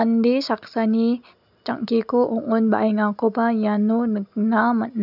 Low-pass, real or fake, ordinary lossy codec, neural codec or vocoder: 5.4 kHz; real; none; none